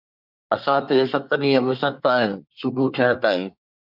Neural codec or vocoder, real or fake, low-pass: codec, 24 kHz, 1 kbps, SNAC; fake; 5.4 kHz